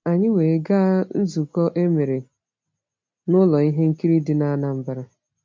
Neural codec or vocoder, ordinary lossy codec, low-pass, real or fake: none; MP3, 48 kbps; 7.2 kHz; real